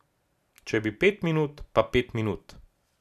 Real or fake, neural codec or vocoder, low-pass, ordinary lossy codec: real; none; 14.4 kHz; none